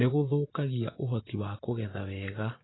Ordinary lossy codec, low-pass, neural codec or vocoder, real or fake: AAC, 16 kbps; 7.2 kHz; vocoder, 24 kHz, 100 mel bands, Vocos; fake